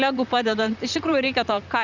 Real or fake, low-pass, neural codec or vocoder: fake; 7.2 kHz; vocoder, 44.1 kHz, 128 mel bands, Pupu-Vocoder